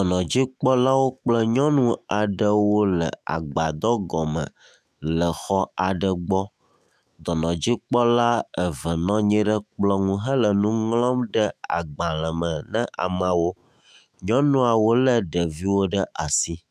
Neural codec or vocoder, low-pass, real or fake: autoencoder, 48 kHz, 128 numbers a frame, DAC-VAE, trained on Japanese speech; 14.4 kHz; fake